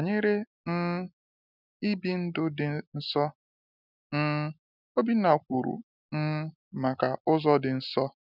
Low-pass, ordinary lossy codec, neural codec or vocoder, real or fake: 5.4 kHz; none; none; real